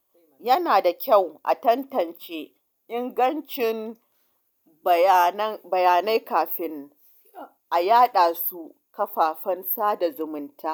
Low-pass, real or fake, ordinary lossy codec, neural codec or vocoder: none; real; none; none